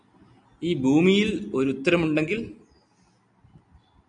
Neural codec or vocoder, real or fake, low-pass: none; real; 9.9 kHz